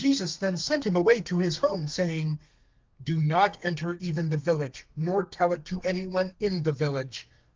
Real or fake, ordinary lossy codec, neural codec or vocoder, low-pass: fake; Opus, 32 kbps; codec, 32 kHz, 1.9 kbps, SNAC; 7.2 kHz